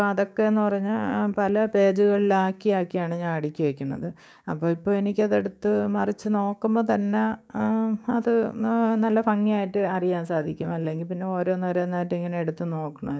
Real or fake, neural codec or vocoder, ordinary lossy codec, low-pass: fake; codec, 16 kHz, 6 kbps, DAC; none; none